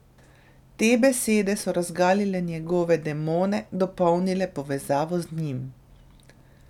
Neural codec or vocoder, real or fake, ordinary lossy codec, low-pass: none; real; none; 19.8 kHz